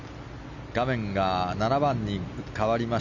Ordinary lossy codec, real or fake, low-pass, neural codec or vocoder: none; real; 7.2 kHz; none